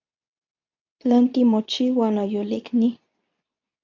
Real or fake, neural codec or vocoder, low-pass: fake; codec, 24 kHz, 0.9 kbps, WavTokenizer, medium speech release version 2; 7.2 kHz